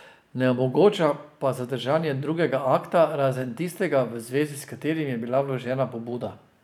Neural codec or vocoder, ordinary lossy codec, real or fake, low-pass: vocoder, 44.1 kHz, 128 mel bands every 512 samples, BigVGAN v2; none; fake; 19.8 kHz